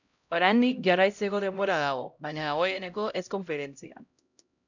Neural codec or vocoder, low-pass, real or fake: codec, 16 kHz, 0.5 kbps, X-Codec, HuBERT features, trained on LibriSpeech; 7.2 kHz; fake